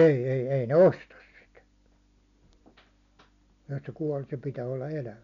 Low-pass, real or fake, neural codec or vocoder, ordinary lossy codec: 7.2 kHz; real; none; none